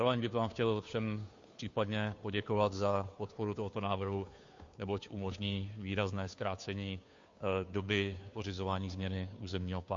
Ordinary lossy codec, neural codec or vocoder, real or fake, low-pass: MP3, 48 kbps; codec, 16 kHz, 2 kbps, FunCodec, trained on Chinese and English, 25 frames a second; fake; 7.2 kHz